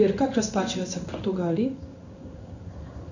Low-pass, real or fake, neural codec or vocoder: 7.2 kHz; fake; codec, 16 kHz in and 24 kHz out, 1 kbps, XY-Tokenizer